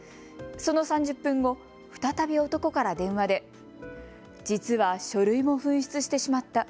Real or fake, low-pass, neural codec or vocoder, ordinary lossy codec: real; none; none; none